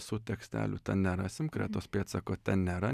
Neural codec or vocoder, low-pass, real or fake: none; 14.4 kHz; real